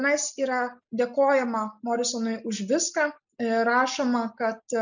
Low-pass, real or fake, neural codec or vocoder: 7.2 kHz; real; none